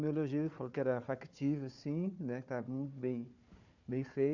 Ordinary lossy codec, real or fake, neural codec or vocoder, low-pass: none; fake; codec, 16 kHz, 4 kbps, FunCodec, trained on Chinese and English, 50 frames a second; 7.2 kHz